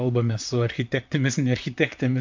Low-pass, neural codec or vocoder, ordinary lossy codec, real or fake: 7.2 kHz; vocoder, 44.1 kHz, 128 mel bands, Pupu-Vocoder; MP3, 64 kbps; fake